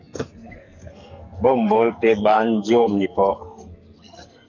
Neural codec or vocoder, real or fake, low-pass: codec, 44.1 kHz, 2.6 kbps, SNAC; fake; 7.2 kHz